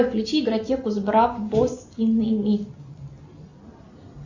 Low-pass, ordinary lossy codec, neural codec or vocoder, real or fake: 7.2 kHz; Opus, 64 kbps; none; real